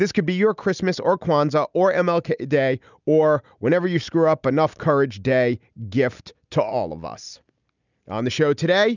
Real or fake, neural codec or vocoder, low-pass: real; none; 7.2 kHz